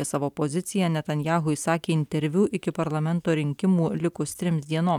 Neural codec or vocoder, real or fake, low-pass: none; real; 19.8 kHz